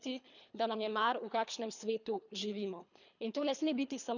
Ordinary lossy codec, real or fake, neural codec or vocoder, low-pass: none; fake; codec, 24 kHz, 3 kbps, HILCodec; 7.2 kHz